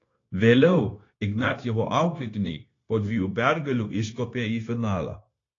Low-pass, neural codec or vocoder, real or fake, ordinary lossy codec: 7.2 kHz; codec, 16 kHz, 0.9 kbps, LongCat-Audio-Codec; fake; AAC, 32 kbps